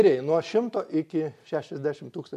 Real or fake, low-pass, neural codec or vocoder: real; 14.4 kHz; none